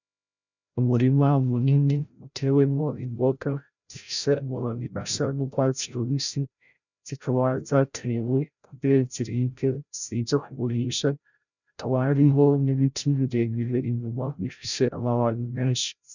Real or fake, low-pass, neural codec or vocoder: fake; 7.2 kHz; codec, 16 kHz, 0.5 kbps, FreqCodec, larger model